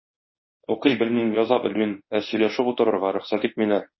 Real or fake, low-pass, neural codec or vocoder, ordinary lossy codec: fake; 7.2 kHz; codec, 24 kHz, 0.9 kbps, WavTokenizer, medium speech release version 1; MP3, 24 kbps